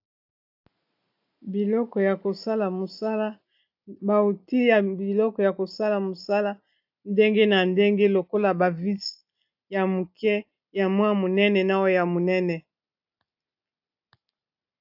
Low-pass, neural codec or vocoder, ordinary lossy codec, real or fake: 5.4 kHz; none; AAC, 48 kbps; real